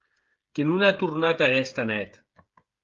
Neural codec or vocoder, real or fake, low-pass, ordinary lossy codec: codec, 16 kHz, 8 kbps, FreqCodec, smaller model; fake; 7.2 kHz; Opus, 32 kbps